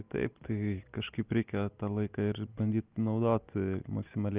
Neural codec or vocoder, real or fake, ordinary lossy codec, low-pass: none; real; Opus, 24 kbps; 3.6 kHz